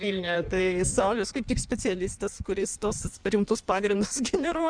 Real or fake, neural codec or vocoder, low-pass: fake; codec, 16 kHz in and 24 kHz out, 1.1 kbps, FireRedTTS-2 codec; 9.9 kHz